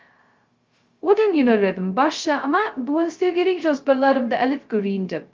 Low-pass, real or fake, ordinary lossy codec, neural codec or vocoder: 7.2 kHz; fake; Opus, 32 kbps; codec, 16 kHz, 0.2 kbps, FocalCodec